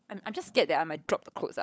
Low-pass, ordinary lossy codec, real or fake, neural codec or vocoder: none; none; fake; codec, 16 kHz, 16 kbps, FunCodec, trained on LibriTTS, 50 frames a second